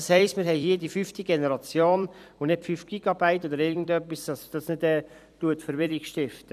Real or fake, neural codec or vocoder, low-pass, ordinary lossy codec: fake; vocoder, 44.1 kHz, 128 mel bands every 256 samples, BigVGAN v2; 14.4 kHz; none